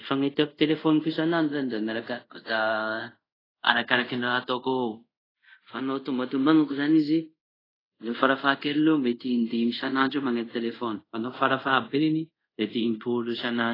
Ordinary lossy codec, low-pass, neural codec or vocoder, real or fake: AAC, 24 kbps; 5.4 kHz; codec, 24 kHz, 0.5 kbps, DualCodec; fake